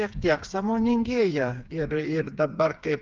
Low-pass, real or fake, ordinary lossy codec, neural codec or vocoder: 7.2 kHz; fake; Opus, 24 kbps; codec, 16 kHz, 4 kbps, FreqCodec, smaller model